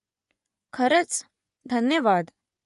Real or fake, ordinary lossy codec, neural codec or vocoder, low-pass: fake; none; vocoder, 24 kHz, 100 mel bands, Vocos; 10.8 kHz